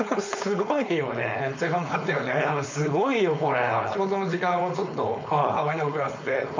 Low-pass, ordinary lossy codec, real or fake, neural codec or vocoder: 7.2 kHz; MP3, 48 kbps; fake; codec, 16 kHz, 4.8 kbps, FACodec